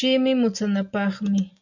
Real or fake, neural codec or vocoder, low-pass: real; none; 7.2 kHz